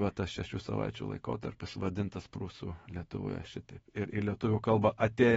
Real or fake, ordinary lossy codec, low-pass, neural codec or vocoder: fake; AAC, 24 kbps; 19.8 kHz; autoencoder, 48 kHz, 128 numbers a frame, DAC-VAE, trained on Japanese speech